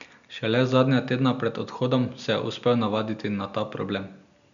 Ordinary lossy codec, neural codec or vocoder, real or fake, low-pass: none; none; real; 7.2 kHz